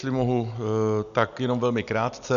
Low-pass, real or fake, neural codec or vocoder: 7.2 kHz; real; none